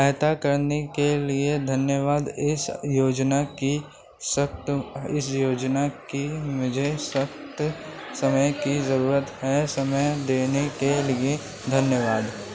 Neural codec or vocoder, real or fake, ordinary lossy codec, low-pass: none; real; none; none